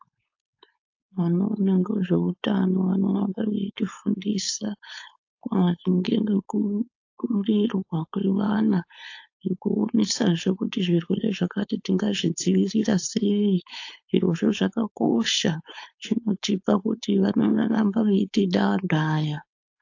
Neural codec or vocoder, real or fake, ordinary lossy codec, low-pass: codec, 16 kHz, 4.8 kbps, FACodec; fake; AAC, 48 kbps; 7.2 kHz